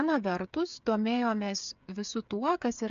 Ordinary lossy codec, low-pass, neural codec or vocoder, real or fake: AAC, 96 kbps; 7.2 kHz; codec, 16 kHz, 8 kbps, FreqCodec, smaller model; fake